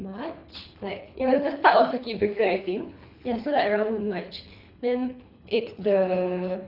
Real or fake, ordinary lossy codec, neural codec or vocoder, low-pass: fake; none; codec, 24 kHz, 3 kbps, HILCodec; 5.4 kHz